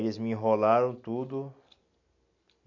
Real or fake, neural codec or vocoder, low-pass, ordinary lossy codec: real; none; 7.2 kHz; AAC, 48 kbps